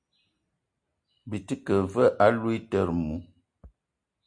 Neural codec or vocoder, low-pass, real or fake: none; 9.9 kHz; real